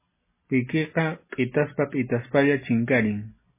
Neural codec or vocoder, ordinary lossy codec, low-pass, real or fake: none; MP3, 16 kbps; 3.6 kHz; real